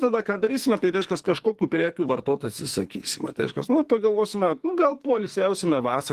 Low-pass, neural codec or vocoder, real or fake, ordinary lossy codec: 14.4 kHz; codec, 44.1 kHz, 2.6 kbps, SNAC; fake; Opus, 24 kbps